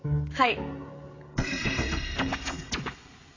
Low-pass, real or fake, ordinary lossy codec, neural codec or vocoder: 7.2 kHz; fake; AAC, 32 kbps; codec, 16 kHz, 16 kbps, FreqCodec, smaller model